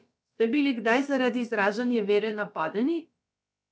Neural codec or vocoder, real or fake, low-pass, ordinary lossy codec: codec, 16 kHz, about 1 kbps, DyCAST, with the encoder's durations; fake; none; none